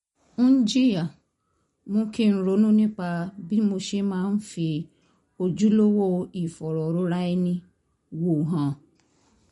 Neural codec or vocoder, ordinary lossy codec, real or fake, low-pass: none; MP3, 48 kbps; real; 19.8 kHz